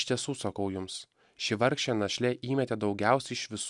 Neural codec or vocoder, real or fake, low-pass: none; real; 10.8 kHz